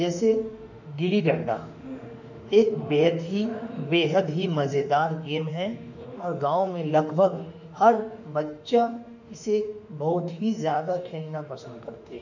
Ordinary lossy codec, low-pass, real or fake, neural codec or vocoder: none; 7.2 kHz; fake; autoencoder, 48 kHz, 32 numbers a frame, DAC-VAE, trained on Japanese speech